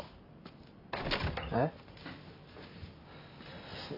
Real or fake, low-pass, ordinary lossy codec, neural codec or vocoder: real; 5.4 kHz; none; none